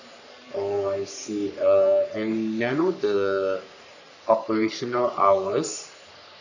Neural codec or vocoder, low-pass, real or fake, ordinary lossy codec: codec, 44.1 kHz, 3.4 kbps, Pupu-Codec; 7.2 kHz; fake; none